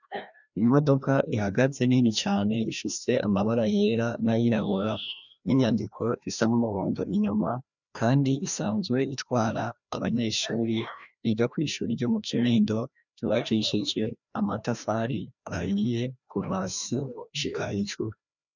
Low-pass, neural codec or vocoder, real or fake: 7.2 kHz; codec, 16 kHz, 1 kbps, FreqCodec, larger model; fake